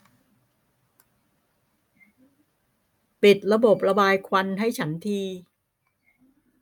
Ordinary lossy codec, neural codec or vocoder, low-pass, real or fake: none; none; 19.8 kHz; real